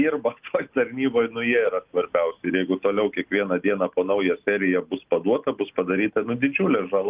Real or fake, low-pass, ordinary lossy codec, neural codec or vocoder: real; 3.6 kHz; Opus, 32 kbps; none